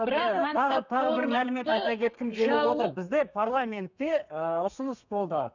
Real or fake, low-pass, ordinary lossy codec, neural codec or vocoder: fake; 7.2 kHz; none; codec, 32 kHz, 1.9 kbps, SNAC